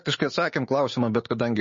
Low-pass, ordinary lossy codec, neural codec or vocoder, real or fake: 7.2 kHz; MP3, 32 kbps; codec, 16 kHz, 6 kbps, DAC; fake